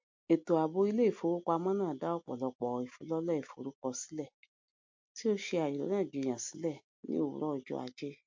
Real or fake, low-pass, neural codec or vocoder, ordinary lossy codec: real; 7.2 kHz; none; MP3, 48 kbps